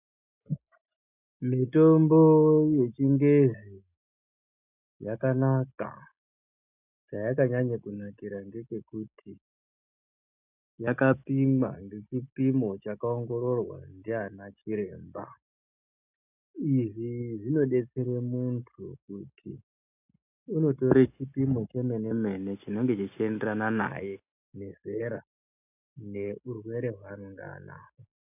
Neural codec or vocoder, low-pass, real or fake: none; 3.6 kHz; real